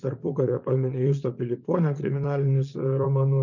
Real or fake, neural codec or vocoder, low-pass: fake; vocoder, 44.1 kHz, 80 mel bands, Vocos; 7.2 kHz